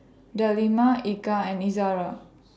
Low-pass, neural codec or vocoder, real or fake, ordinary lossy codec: none; none; real; none